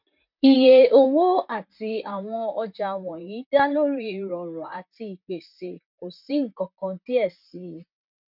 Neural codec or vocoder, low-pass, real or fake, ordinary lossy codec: vocoder, 44.1 kHz, 128 mel bands, Pupu-Vocoder; 5.4 kHz; fake; none